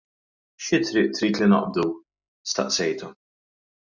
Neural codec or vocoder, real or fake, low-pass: none; real; 7.2 kHz